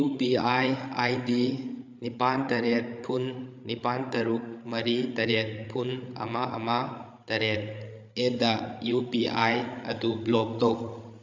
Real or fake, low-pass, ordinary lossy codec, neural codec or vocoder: fake; 7.2 kHz; MP3, 64 kbps; codec, 16 kHz, 8 kbps, FreqCodec, larger model